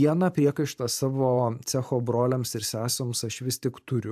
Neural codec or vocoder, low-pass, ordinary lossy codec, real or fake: vocoder, 44.1 kHz, 128 mel bands every 512 samples, BigVGAN v2; 14.4 kHz; AAC, 96 kbps; fake